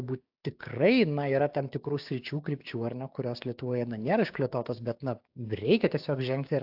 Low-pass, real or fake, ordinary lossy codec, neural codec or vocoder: 5.4 kHz; fake; AAC, 48 kbps; codec, 16 kHz, 6 kbps, DAC